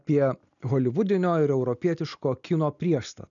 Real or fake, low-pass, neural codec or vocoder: real; 7.2 kHz; none